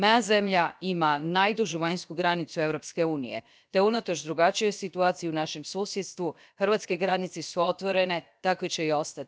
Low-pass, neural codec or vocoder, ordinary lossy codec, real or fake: none; codec, 16 kHz, about 1 kbps, DyCAST, with the encoder's durations; none; fake